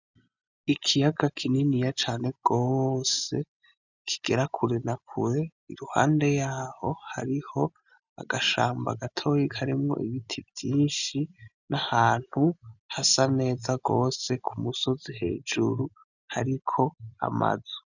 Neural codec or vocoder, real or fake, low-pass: none; real; 7.2 kHz